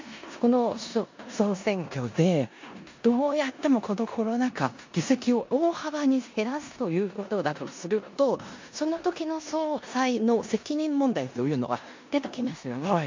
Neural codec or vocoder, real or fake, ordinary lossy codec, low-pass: codec, 16 kHz in and 24 kHz out, 0.9 kbps, LongCat-Audio-Codec, four codebook decoder; fake; MP3, 48 kbps; 7.2 kHz